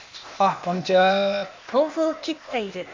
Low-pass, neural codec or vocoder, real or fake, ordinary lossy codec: 7.2 kHz; codec, 16 kHz, 0.8 kbps, ZipCodec; fake; MP3, 64 kbps